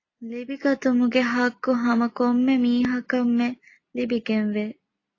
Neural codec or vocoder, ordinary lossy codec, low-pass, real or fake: none; AAC, 32 kbps; 7.2 kHz; real